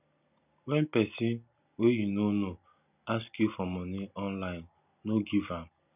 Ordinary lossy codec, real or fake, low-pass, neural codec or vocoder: none; real; 3.6 kHz; none